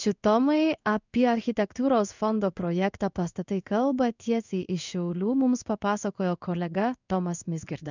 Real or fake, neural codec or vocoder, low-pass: fake; codec, 16 kHz in and 24 kHz out, 1 kbps, XY-Tokenizer; 7.2 kHz